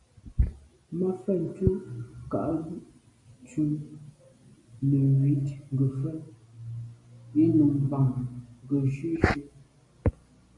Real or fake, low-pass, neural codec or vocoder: real; 10.8 kHz; none